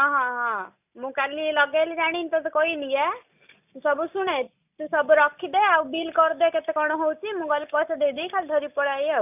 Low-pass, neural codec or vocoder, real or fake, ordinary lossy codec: 3.6 kHz; none; real; none